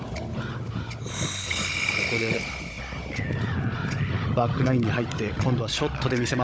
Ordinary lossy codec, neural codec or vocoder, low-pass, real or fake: none; codec, 16 kHz, 16 kbps, FunCodec, trained on Chinese and English, 50 frames a second; none; fake